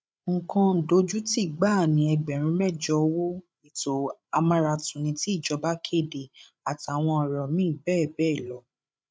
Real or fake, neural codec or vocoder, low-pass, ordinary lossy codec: fake; codec, 16 kHz, 8 kbps, FreqCodec, larger model; none; none